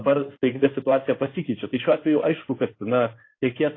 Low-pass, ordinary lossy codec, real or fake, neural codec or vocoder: 7.2 kHz; AAC, 32 kbps; fake; codec, 16 kHz in and 24 kHz out, 2.2 kbps, FireRedTTS-2 codec